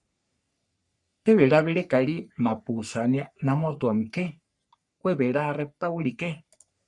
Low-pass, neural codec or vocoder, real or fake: 10.8 kHz; codec, 44.1 kHz, 3.4 kbps, Pupu-Codec; fake